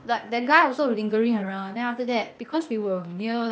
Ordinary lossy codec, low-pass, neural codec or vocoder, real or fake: none; none; codec, 16 kHz, 0.8 kbps, ZipCodec; fake